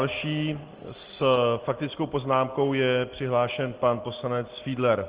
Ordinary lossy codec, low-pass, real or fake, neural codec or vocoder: Opus, 16 kbps; 3.6 kHz; real; none